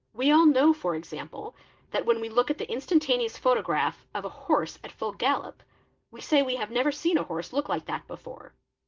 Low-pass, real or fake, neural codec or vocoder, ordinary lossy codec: 7.2 kHz; real; none; Opus, 16 kbps